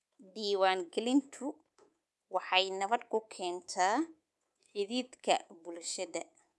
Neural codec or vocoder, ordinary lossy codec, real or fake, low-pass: codec, 24 kHz, 3.1 kbps, DualCodec; none; fake; none